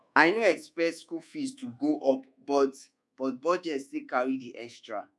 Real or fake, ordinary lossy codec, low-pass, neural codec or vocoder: fake; none; none; codec, 24 kHz, 1.2 kbps, DualCodec